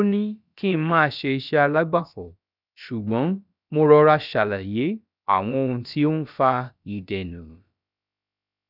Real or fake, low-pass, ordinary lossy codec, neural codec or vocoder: fake; 5.4 kHz; none; codec, 16 kHz, about 1 kbps, DyCAST, with the encoder's durations